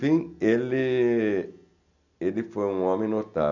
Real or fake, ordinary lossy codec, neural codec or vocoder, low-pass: real; none; none; 7.2 kHz